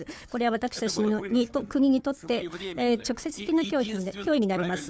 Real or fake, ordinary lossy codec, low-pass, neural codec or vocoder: fake; none; none; codec, 16 kHz, 4 kbps, FunCodec, trained on Chinese and English, 50 frames a second